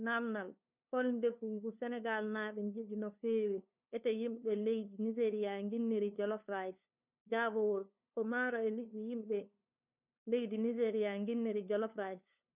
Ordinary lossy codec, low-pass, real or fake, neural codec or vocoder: none; 3.6 kHz; fake; codec, 16 kHz, 2 kbps, FunCodec, trained on LibriTTS, 25 frames a second